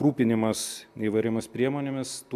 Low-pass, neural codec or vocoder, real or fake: 14.4 kHz; none; real